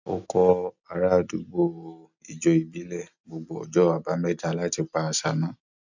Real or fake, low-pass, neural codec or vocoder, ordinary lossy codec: real; 7.2 kHz; none; none